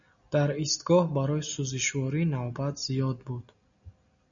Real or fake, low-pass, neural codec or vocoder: real; 7.2 kHz; none